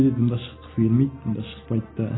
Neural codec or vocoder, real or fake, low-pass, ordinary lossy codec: none; real; 7.2 kHz; AAC, 16 kbps